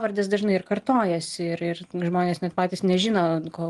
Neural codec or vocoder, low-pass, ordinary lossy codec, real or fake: none; 10.8 kHz; Opus, 24 kbps; real